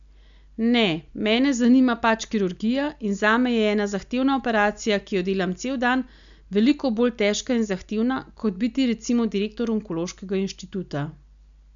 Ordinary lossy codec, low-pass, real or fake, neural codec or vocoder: none; 7.2 kHz; real; none